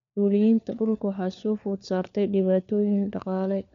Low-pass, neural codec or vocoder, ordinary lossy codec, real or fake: 7.2 kHz; codec, 16 kHz, 4 kbps, FunCodec, trained on LibriTTS, 50 frames a second; MP3, 64 kbps; fake